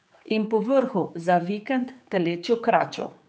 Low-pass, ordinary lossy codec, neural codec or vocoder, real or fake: none; none; codec, 16 kHz, 4 kbps, X-Codec, HuBERT features, trained on general audio; fake